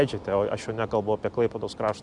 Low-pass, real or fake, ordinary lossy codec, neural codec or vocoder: 10.8 kHz; real; Opus, 64 kbps; none